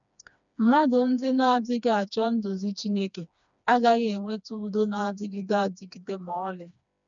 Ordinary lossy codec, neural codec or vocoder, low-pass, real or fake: none; codec, 16 kHz, 2 kbps, FreqCodec, smaller model; 7.2 kHz; fake